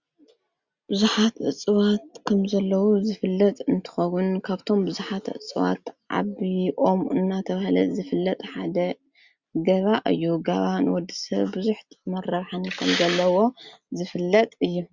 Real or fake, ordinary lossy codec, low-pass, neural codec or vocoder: real; Opus, 64 kbps; 7.2 kHz; none